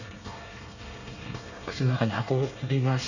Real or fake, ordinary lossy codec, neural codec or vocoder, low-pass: fake; none; codec, 24 kHz, 1 kbps, SNAC; 7.2 kHz